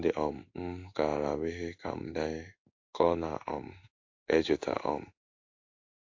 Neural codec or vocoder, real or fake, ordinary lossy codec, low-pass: codec, 16 kHz in and 24 kHz out, 1 kbps, XY-Tokenizer; fake; AAC, 48 kbps; 7.2 kHz